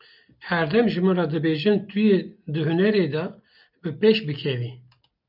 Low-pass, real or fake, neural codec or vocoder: 5.4 kHz; real; none